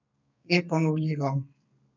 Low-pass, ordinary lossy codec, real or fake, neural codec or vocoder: 7.2 kHz; none; fake; codec, 32 kHz, 1.9 kbps, SNAC